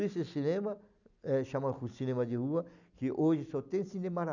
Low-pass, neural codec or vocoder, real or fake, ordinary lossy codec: 7.2 kHz; none; real; none